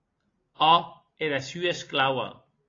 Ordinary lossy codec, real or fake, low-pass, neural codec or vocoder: AAC, 32 kbps; real; 7.2 kHz; none